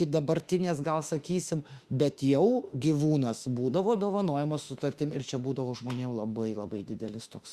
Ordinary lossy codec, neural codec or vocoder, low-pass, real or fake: Opus, 64 kbps; autoencoder, 48 kHz, 32 numbers a frame, DAC-VAE, trained on Japanese speech; 14.4 kHz; fake